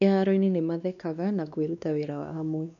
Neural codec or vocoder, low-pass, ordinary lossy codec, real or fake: codec, 16 kHz, 2 kbps, X-Codec, WavLM features, trained on Multilingual LibriSpeech; 7.2 kHz; none; fake